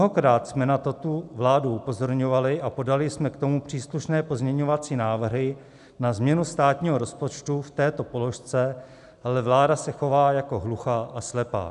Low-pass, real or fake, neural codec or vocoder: 10.8 kHz; real; none